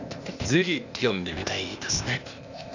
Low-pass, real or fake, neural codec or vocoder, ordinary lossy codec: 7.2 kHz; fake; codec, 16 kHz, 0.8 kbps, ZipCodec; none